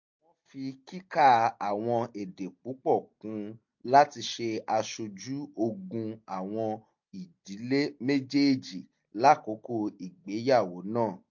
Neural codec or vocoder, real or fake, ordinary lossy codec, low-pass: none; real; MP3, 48 kbps; 7.2 kHz